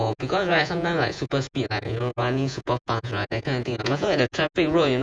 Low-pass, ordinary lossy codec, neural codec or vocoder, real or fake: 9.9 kHz; none; vocoder, 48 kHz, 128 mel bands, Vocos; fake